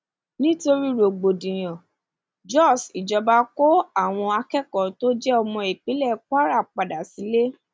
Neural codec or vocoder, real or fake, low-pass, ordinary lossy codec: none; real; none; none